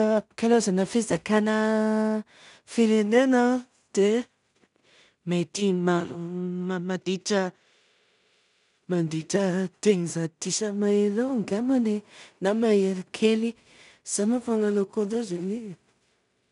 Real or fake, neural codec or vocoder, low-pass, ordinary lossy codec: fake; codec, 16 kHz in and 24 kHz out, 0.4 kbps, LongCat-Audio-Codec, two codebook decoder; 10.8 kHz; none